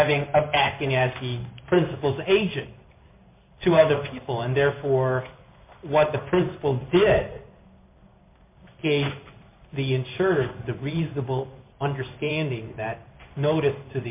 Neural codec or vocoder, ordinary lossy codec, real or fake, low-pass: codec, 16 kHz in and 24 kHz out, 1 kbps, XY-Tokenizer; MP3, 32 kbps; fake; 3.6 kHz